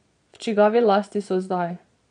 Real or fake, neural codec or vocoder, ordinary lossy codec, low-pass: fake; vocoder, 22.05 kHz, 80 mel bands, Vocos; none; 9.9 kHz